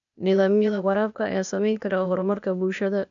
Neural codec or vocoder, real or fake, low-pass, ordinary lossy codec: codec, 16 kHz, 0.8 kbps, ZipCodec; fake; 7.2 kHz; none